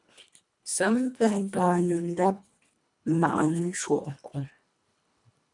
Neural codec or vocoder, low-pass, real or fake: codec, 24 kHz, 1.5 kbps, HILCodec; 10.8 kHz; fake